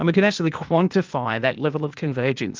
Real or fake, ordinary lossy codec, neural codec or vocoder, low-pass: fake; Opus, 24 kbps; codec, 16 kHz, 0.8 kbps, ZipCodec; 7.2 kHz